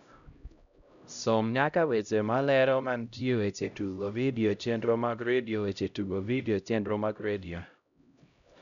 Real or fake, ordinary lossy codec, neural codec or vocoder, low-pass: fake; none; codec, 16 kHz, 0.5 kbps, X-Codec, HuBERT features, trained on LibriSpeech; 7.2 kHz